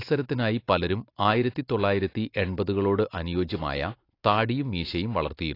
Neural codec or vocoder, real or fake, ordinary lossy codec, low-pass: none; real; AAC, 32 kbps; 5.4 kHz